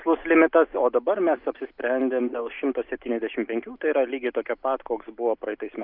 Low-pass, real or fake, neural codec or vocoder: 5.4 kHz; real; none